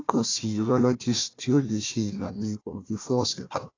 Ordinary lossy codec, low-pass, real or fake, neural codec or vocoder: AAC, 32 kbps; 7.2 kHz; fake; codec, 16 kHz, 1 kbps, FunCodec, trained on Chinese and English, 50 frames a second